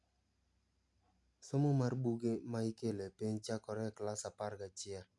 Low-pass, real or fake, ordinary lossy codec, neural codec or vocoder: 10.8 kHz; real; none; none